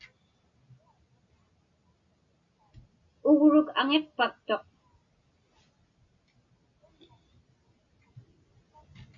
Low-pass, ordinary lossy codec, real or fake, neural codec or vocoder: 7.2 kHz; MP3, 48 kbps; real; none